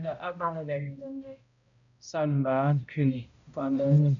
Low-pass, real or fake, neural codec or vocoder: 7.2 kHz; fake; codec, 16 kHz, 0.5 kbps, X-Codec, HuBERT features, trained on balanced general audio